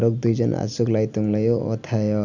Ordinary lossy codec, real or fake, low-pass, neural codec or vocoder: none; real; 7.2 kHz; none